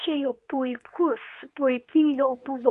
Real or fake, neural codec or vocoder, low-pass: fake; codec, 24 kHz, 0.9 kbps, WavTokenizer, medium speech release version 2; 10.8 kHz